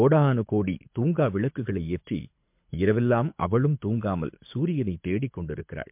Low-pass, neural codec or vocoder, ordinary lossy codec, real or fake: 3.6 kHz; codec, 24 kHz, 6 kbps, HILCodec; MP3, 32 kbps; fake